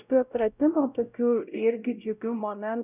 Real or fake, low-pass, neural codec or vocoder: fake; 3.6 kHz; codec, 16 kHz, 0.5 kbps, X-Codec, WavLM features, trained on Multilingual LibriSpeech